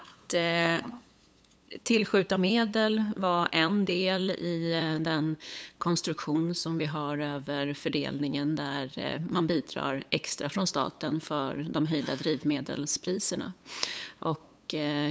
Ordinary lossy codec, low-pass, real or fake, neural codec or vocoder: none; none; fake; codec, 16 kHz, 8 kbps, FunCodec, trained on LibriTTS, 25 frames a second